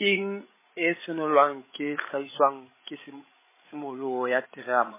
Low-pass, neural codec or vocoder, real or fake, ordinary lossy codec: 3.6 kHz; codec, 16 kHz, 16 kbps, FreqCodec, larger model; fake; MP3, 16 kbps